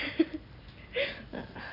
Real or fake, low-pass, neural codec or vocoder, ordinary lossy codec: real; 5.4 kHz; none; none